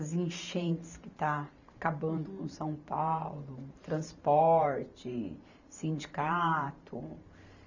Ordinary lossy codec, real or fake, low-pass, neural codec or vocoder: none; fake; 7.2 kHz; vocoder, 44.1 kHz, 128 mel bands every 512 samples, BigVGAN v2